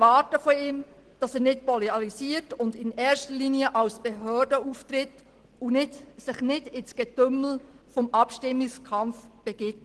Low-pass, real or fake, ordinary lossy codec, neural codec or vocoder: 10.8 kHz; real; Opus, 16 kbps; none